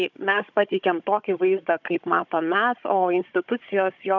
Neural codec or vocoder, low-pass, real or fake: codec, 16 kHz, 16 kbps, FunCodec, trained on Chinese and English, 50 frames a second; 7.2 kHz; fake